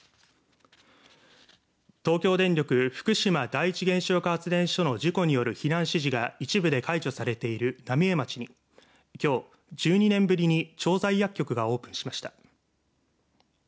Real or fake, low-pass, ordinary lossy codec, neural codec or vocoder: real; none; none; none